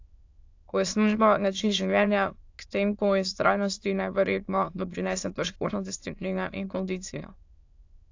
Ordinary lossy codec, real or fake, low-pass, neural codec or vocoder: AAC, 48 kbps; fake; 7.2 kHz; autoencoder, 22.05 kHz, a latent of 192 numbers a frame, VITS, trained on many speakers